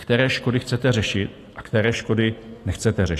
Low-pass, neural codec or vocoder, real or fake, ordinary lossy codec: 14.4 kHz; none; real; AAC, 48 kbps